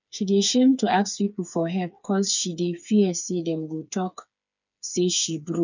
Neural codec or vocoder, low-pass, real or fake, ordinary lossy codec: codec, 16 kHz, 4 kbps, FreqCodec, smaller model; 7.2 kHz; fake; none